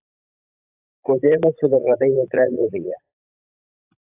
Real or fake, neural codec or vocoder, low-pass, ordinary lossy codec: fake; vocoder, 44.1 kHz, 80 mel bands, Vocos; 3.6 kHz; AAC, 32 kbps